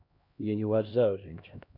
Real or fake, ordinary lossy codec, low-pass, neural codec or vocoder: fake; none; 5.4 kHz; codec, 16 kHz, 1 kbps, X-Codec, HuBERT features, trained on LibriSpeech